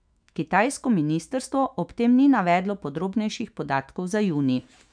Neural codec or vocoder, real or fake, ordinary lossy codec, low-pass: autoencoder, 48 kHz, 128 numbers a frame, DAC-VAE, trained on Japanese speech; fake; none; 9.9 kHz